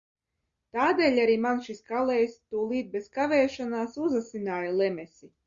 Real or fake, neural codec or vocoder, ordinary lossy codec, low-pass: real; none; Opus, 64 kbps; 7.2 kHz